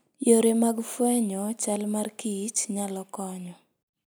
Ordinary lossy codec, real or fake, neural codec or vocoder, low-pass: none; real; none; none